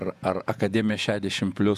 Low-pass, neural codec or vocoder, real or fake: 14.4 kHz; vocoder, 48 kHz, 128 mel bands, Vocos; fake